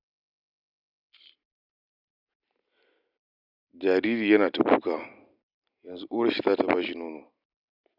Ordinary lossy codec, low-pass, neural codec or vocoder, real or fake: none; 5.4 kHz; none; real